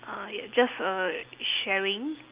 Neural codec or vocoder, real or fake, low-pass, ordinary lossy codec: none; real; 3.6 kHz; Opus, 24 kbps